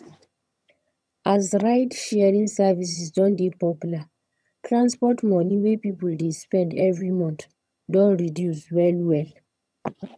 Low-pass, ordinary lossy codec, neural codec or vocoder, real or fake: none; none; vocoder, 22.05 kHz, 80 mel bands, HiFi-GAN; fake